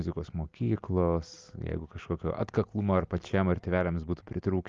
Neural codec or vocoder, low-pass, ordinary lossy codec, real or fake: none; 7.2 kHz; Opus, 32 kbps; real